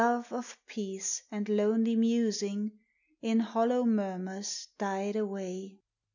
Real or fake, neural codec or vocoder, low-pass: real; none; 7.2 kHz